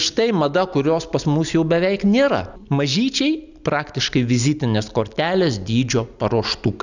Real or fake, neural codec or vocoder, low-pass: real; none; 7.2 kHz